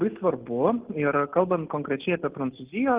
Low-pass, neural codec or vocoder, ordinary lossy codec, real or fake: 3.6 kHz; none; Opus, 16 kbps; real